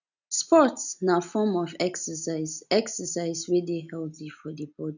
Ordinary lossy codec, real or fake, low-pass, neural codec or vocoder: none; real; 7.2 kHz; none